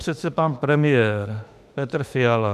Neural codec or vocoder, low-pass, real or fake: autoencoder, 48 kHz, 32 numbers a frame, DAC-VAE, trained on Japanese speech; 14.4 kHz; fake